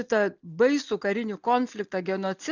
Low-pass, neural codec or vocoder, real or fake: 7.2 kHz; none; real